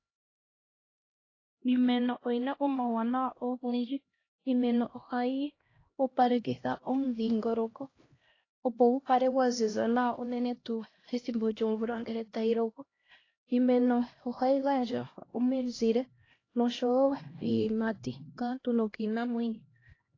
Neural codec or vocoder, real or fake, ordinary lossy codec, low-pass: codec, 16 kHz, 1 kbps, X-Codec, HuBERT features, trained on LibriSpeech; fake; AAC, 32 kbps; 7.2 kHz